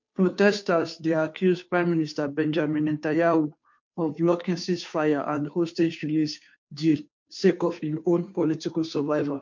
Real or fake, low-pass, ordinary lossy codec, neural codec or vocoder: fake; 7.2 kHz; MP3, 48 kbps; codec, 16 kHz, 2 kbps, FunCodec, trained on Chinese and English, 25 frames a second